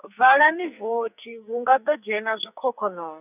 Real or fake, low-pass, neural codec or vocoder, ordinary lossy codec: fake; 3.6 kHz; codec, 44.1 kHz, 2.6 kbps, SNAC; none